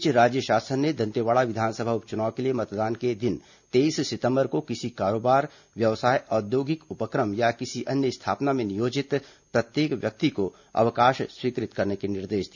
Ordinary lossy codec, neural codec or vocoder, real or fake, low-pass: none; none; real; 7.2 kHz